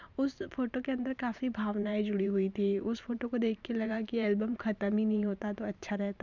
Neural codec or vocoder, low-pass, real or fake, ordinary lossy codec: vocoder, 44.1 kHz, 128 mel bands every 512 samples, BigVGAN v2; 7.2 kHz; fake; none